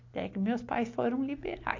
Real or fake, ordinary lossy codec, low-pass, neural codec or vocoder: real; AAC, 48 kbps; 7.2 kHz; none